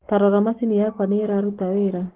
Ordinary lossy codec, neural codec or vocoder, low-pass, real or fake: Opus, 16 kbps; codec, 44.1 kHz, 7.8 kbps, Pupu-Codec; 3.6 kHz; fake